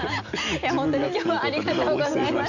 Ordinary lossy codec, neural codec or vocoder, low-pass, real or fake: Opus, 64 kbps; none; 7.2 kHz; real